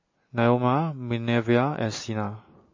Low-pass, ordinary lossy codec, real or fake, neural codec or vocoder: 7.2 kHz; MP3, 32 kbps; real; none